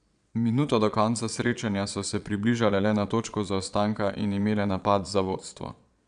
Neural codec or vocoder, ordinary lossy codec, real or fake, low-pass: vocoder, 22.05 kHz, 80 mel bands, Vocos; none; fake; 9.9 kHz